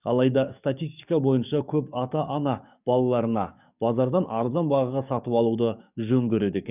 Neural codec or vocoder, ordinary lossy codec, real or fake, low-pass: codec, 44.1 kHz, 7.8 kbps, DAC; none; fake; 3.6 kHz